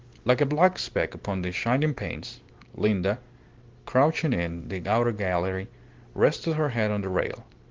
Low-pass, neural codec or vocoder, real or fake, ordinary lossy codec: 7.2 kHz; none; real; Opus, 16 kbps